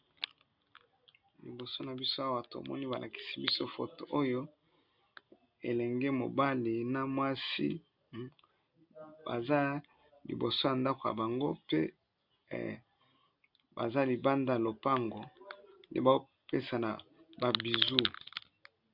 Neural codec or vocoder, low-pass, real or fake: none; 5.4 kHz; real